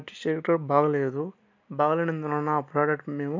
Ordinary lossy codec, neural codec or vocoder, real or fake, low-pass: MP3, 64 kbps; none; real; 7.2 kHz